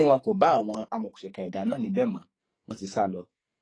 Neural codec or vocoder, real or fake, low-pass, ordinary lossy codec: codec, 44.1 kHz, 2.6 kbps, SNAC; fake; 9.9 kHz; AAC, 32 kbps